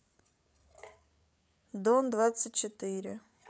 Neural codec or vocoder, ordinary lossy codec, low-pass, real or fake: codec, 16 kHz, 8 kbps, FreqCodec, larger model; none; none; fake